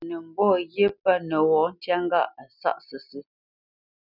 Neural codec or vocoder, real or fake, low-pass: none; real; 5.4 kHz